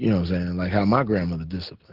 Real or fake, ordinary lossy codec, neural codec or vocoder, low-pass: real; Opus, 16 kbps; none; 5.4 kHz